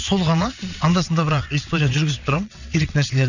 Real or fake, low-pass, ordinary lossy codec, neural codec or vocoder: fake; 7.2 kHz; none; vocoder, 22.05 kHz, 80 mel bands, WaveNeXt